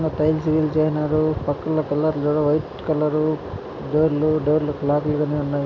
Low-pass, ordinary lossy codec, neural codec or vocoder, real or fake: 7.2 kHz; none; none; real